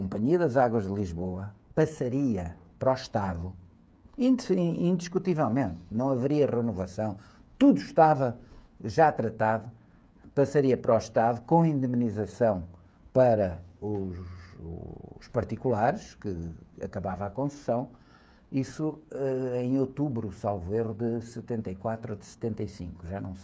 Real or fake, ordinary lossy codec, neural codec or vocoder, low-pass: fake; none; codec, 16 kHz, 8 kbps, FreqCodec, smaller model; none